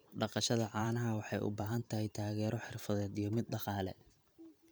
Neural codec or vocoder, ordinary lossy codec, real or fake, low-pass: vocoder, 44.1 kHz, 128 mel bands every 512 samples, BigVGAN v2; none; fake; none